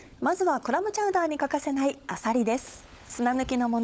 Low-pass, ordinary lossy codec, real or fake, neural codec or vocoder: none; none; fake; codec, 16 kHz, 16 kbps, FunCodec, trained on LibriTTS, 50 frames a second